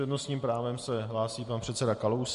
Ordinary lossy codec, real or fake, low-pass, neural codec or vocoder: MP3, 48 kbps; real; 14.4 kHz; none